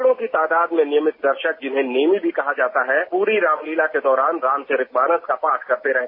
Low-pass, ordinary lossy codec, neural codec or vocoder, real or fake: 5.4 kHz; MP3, 32 kbps; none; real